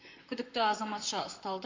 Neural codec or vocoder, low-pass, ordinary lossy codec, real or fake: vocoder, 44.1 kHz, 128 mel bands, Pupu-Vocoder; 7.2 kHz; MP3, 48 kbps; fake